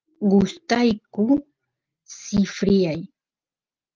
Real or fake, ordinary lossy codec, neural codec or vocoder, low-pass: real; Opus, 24 kbps; none; 7.2 kHz